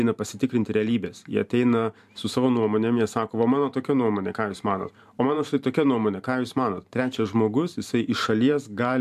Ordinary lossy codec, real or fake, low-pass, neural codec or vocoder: MP3, 96 kbps; fake; 14.4 kHz; vocoder, 44.1 kHz, 128 mel bands every 512 samples, BigVGAN v2